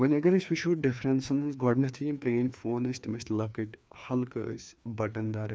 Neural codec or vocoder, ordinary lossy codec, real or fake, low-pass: codec, 16 kHz, 2 kbps, FreqCodec, larger model; none; fake; none